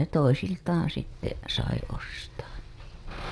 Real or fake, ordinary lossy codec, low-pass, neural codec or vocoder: fake; none; none; vocoder, 22.05 kHz, 80 mel bands, WaveNeXt